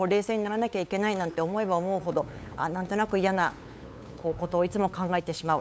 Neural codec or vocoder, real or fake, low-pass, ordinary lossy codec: codec, 16 kHz, 8 kbps, FunCodec, trained on LibriTTS, 25 frames a second; fake; none; none